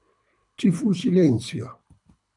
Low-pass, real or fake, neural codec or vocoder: 10.8 kHz; fake; codec, 24 kHz, 3 kbps, HILCodec